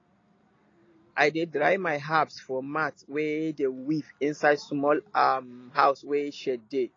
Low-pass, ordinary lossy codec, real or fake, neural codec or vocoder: 7.2 kHz; AAC, 32 kbps; real; none